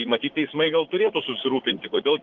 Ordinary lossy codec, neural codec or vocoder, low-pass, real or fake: Opus, 16 kbps; vocoder, 44.1 kHz, 80 mel bands, Vocos; 7.2 kHz; fake